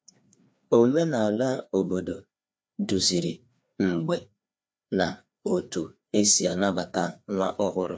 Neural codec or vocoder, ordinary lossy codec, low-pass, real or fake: codec, 16 kHz, 2 kbps, FreqCodec, larger model; none; none; fake